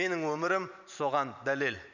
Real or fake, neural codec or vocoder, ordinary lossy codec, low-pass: fake; vocoder, 44.1 kHz, 128 mel bands every 512 samples, BigVGAN v2; none; 7.2 kHz